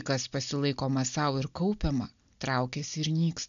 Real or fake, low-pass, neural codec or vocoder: real; 7.2 kHz; none